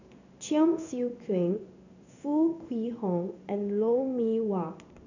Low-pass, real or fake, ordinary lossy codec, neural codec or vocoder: 7.2 kHz; fake; none; codec, 16 kHz in and 24 kHz out, 1 kbps, XY-Tokenizer